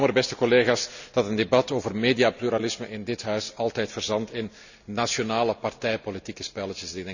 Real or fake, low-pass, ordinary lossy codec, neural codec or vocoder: real; 7.2 kHz; none; none